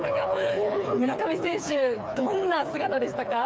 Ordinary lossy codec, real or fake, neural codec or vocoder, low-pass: none; fake; codec, 16 kHz, 4 kbps, FreqCodec, smaller model; none